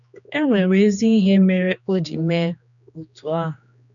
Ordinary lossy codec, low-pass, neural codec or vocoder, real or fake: none; 7.2 kHz; codec, 16 kHz, 2 kbps, X-Codec, HuBERT features, trained on general audio; fake